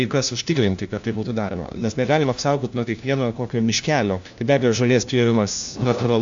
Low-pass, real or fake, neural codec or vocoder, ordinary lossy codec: 7.2 kHz; fake; codec, 16 kHz, 1 kbps, FunCodec, trained on LibriTTS, 50 frames a second; AAC, 64 kbps